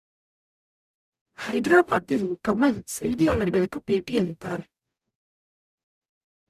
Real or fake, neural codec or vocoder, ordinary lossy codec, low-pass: fake; codec, 44.1 kHz, 0.9 kbps, DAC; none; 14.4 kHz